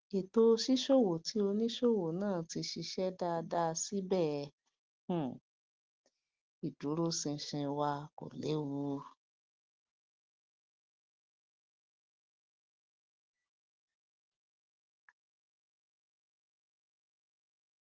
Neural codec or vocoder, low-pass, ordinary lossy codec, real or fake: none; 7.2 kHz; Opus, 16 kbps; real